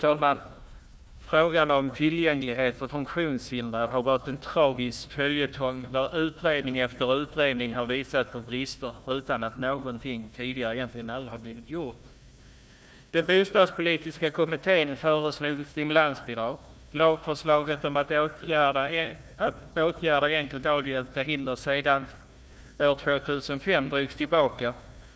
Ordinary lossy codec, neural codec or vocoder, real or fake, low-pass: none; codec, 16 kHz, 1 kbps, FunCodec, trained on Chinese and English, 50 frames a second; fake; none